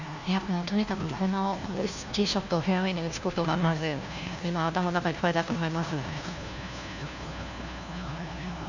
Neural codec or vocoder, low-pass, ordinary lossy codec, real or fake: codec, 16 kHz, 1 kbps, FunCodec, trained on LibriTTS, 50 frames a second; 7.2 kHz; none; fake